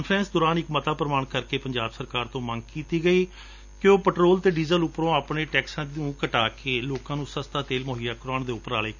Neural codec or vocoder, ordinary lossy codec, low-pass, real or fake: none; none; 7.2 kHz; real